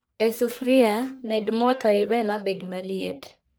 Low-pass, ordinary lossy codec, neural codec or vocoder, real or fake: none; none; codec, 44.1 kHz, 1.7 kbps, Pupu-Codec; fake